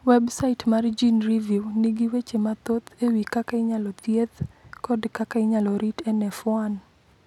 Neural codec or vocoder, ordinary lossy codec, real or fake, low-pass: none; none; real; 19.8 kHz